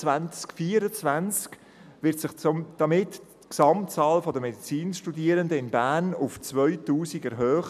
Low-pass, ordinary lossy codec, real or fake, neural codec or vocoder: 14.4 kHz; none; real; none